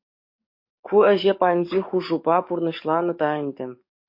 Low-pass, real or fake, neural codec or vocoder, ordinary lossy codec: 5.4 kHz; fake; codec, 44.1 kHz, 7.8 kbps, DAC; MP3, 32 kbps